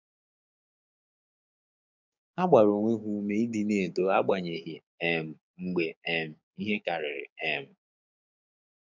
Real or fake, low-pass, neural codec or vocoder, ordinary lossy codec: fake; 7.2 kHz; codec, 16 kHz, 6 kbps, DAC; none